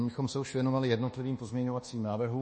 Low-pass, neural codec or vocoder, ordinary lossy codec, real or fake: 10.8 kHz; codec, 24 kHz, 1.2 kbps, DualCodec; MP3, 32 kbps; fake